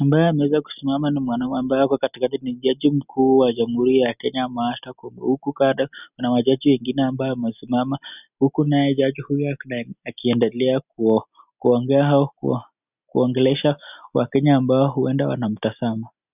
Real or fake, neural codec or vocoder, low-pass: real; none; 3.6 kHz